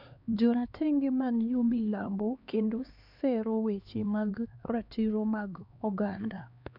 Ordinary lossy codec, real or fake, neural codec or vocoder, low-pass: none; fake; codec, 16 kHz, 2 kbps, X-Codec, HuBERT features, trained on LibriSpeech; 5.4 kHz